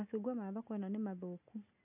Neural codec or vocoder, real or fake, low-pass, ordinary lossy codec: none; real; 3.6 kHz; none